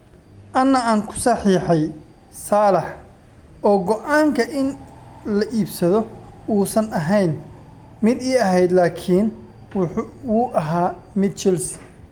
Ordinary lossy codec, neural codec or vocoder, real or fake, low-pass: Opus, 32 kbps; none; real; 19.8 kHz